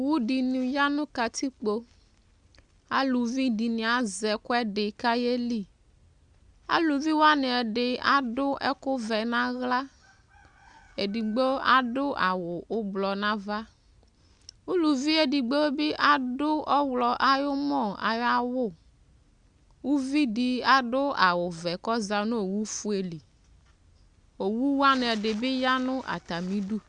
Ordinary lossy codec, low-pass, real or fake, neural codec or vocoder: Opus, 64 kbps; 9.9 kHz; real; none